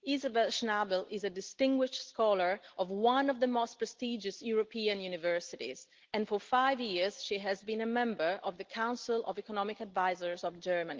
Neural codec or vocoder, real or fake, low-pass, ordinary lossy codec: none; real; 7.2 kHz; Opus, 16 kbps